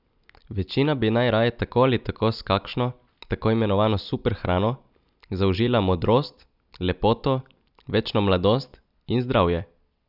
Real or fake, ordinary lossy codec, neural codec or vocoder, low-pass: real; none; none; 5.4 kHz